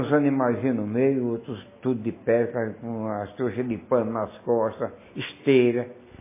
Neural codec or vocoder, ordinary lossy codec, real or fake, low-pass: none; MP3, 16 kbps; real; 3.6 kHz